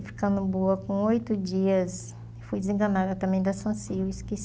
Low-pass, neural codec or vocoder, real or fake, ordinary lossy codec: none; none; real; none